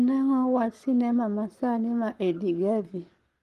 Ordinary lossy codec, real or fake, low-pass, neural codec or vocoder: Opus, 24 kbps; fake; 14.4 kHz; codec, 44.1 kHz, 7.8 kbps, Pupu-Codec